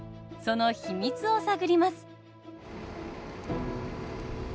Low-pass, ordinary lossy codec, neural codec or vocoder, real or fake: none; none; none; real